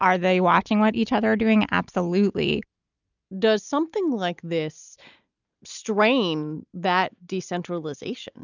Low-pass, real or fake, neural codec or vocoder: 7.2 kHz; real; none